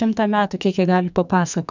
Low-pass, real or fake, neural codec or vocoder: 7.2 kHz; fake; codec, 44.1 kHz, 2.6 kbps, SNAC